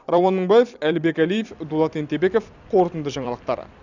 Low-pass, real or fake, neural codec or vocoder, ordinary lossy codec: 7.2 kHz; fake; vocoder, 44.1 kHz, 128 mel bands every 256 samples, BigVGAN v2; none